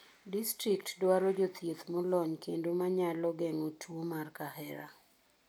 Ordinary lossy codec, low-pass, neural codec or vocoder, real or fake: none; none; none; real